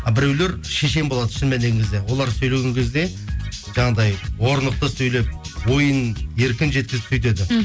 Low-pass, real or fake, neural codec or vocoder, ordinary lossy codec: none; real; none; none